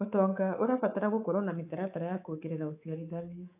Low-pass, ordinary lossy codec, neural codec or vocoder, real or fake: 3.6 kHz; AAC, 32 kbps; codec, 24 kHz, 3.1 kbps, DualCodec; fake